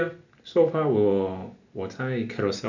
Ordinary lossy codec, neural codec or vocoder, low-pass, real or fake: none; none; 7.2 kHz; real